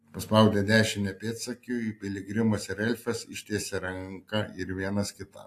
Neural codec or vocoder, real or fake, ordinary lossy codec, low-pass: none; real; AAC, 64 kbps; 14.4 kHz